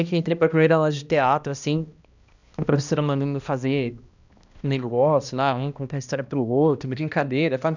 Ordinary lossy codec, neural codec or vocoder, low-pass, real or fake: none; codec, 16 kHz, 1 kbps, X-Codec, HuBERT features, trained on balanced general audio; 7.2 kHz; fake